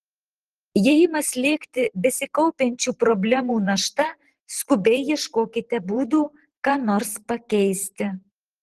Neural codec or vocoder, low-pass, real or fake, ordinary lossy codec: vocoder, 44.1 kHz, 128 mel bands every 512 samples, BigVGAN v2; 14.4 kHz; fake; Opus, 16 kbps